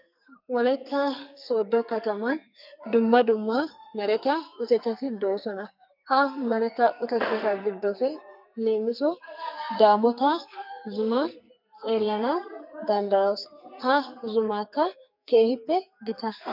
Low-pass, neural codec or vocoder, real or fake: 5.4 kHz; codec, 44.1 kHz, 2.6 kbps, SNAC; fake